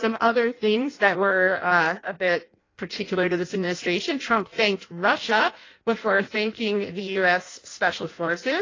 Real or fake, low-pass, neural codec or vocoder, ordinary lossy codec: fake; 7.2 kHz; codec, 16 kHz in and 24 kHz out, 0.6 kbps, FireRedTTS-2 codec; AAC, 32 kbps